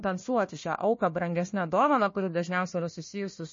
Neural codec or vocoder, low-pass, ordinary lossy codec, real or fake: codec, 16 kHz, 1 kbps, FunCodec, trained on Chinese and English, 50 frames a second; 7.2 kHz; MP3, 32 kbps; fake